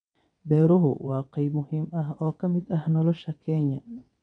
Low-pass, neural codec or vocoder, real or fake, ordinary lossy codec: 9.9 kHz; vocoder, 22.05 kHz, 80 mel bands, WaveNeXt; fake; none